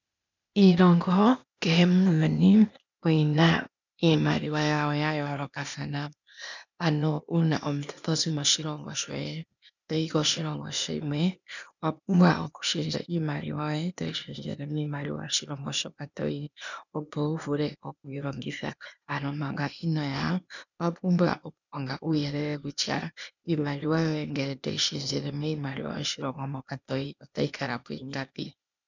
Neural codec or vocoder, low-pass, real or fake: codec, 16 kHz, 0.8 kbps, ZipCodec; 7.2 kHz; fake